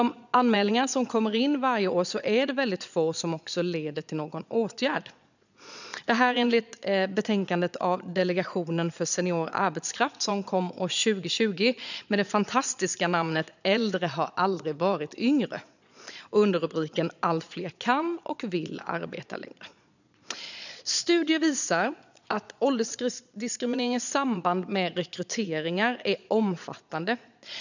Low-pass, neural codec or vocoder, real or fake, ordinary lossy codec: 7.2 kHz; vocoder, 22.05 kHz, 80 mel bands, Vocos; fake; none